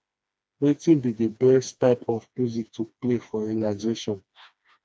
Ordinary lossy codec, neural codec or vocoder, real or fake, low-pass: none; codec, 16 kHz, 2 kbps, FreqCodec, smaller model; fake; none